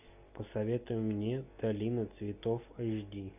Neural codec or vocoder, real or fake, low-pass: none; real; 3.6 kHz